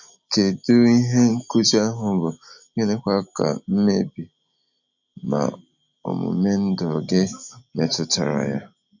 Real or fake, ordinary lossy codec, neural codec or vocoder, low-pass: real; none; none; 7.2 kHz